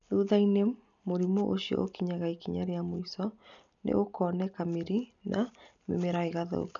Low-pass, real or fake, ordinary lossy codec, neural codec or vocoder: 7.2 kHz; real; none; none